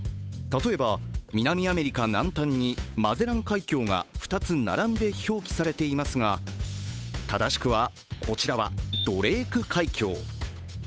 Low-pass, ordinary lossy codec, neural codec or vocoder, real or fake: none; none; codec, 16 kHz, 8 kbps, FunCodec, trained on Chinese and English, 25 frames a second; fake